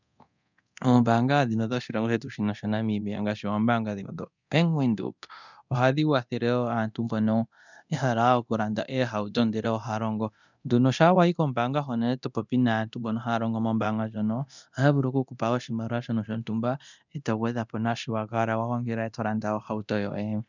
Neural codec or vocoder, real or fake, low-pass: codec, 24 kHz, 0.9 kbps, DualCodec; fake; 7.2 kHz